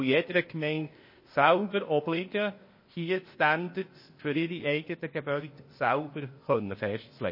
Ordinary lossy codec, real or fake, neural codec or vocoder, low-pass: MP3, 24 kbps; fake; codec, 16 kHz, 0.8 kbps, ZipCodec; 5.4 kHz